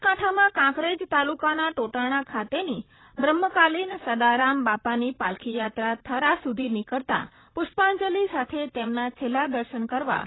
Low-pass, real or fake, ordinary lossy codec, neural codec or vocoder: 7.2 kHz; fake; AAC, 16 kbps; vocoder, 44.1 kHz, 128 mel bands, Pupu-Vocoder